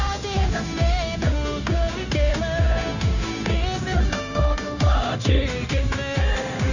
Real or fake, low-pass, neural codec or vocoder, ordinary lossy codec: fake; 7.2 kHz; codec, 16 kHz, 0.9 kbps, LongCat-Audio-Codec; none